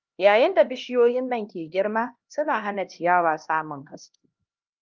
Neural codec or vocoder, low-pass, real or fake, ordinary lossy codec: codec, 16 kHz, 1 kbps, X-Codec, HuBERT features, trained on LibriSpeech; 7.2 kHz; fake; Opus, 24 kbps